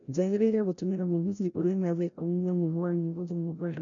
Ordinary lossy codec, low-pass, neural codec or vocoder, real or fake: none; 7.2 kHz; codec, 16 kHz, 0.5 kbps, FreqCodec, larger model; fake